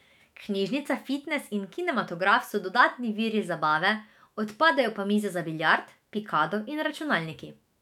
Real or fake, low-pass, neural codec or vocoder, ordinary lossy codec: fake; 19.8 kHz; autoencoder, 48 kHz, 128 numbers a frame, DAC-VAE, trained on Japanese speech; none